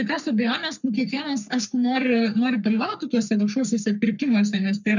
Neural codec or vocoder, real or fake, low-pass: codec, 44.1 kHz, 3.4 kbps, Pupu-Codec; fake; 7.2 kHz